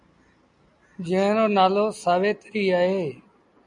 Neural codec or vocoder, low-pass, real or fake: none; 10.8 kHz; real